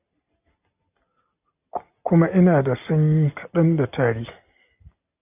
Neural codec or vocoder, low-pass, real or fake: none; 3.6 kHz; real